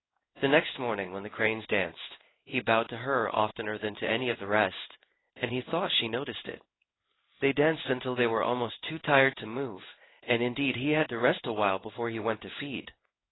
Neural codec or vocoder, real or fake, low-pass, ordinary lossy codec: codec, 16 kHz in and 24 kHz out, 1 kbps, XY-Tokenizer; fake; 7.2 kHz; AAC, 16 kbps